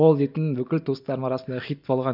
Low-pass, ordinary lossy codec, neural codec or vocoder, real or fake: 5.4 kHz; none; none; real